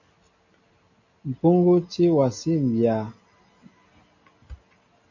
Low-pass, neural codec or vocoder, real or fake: 7.2 kHz; none; real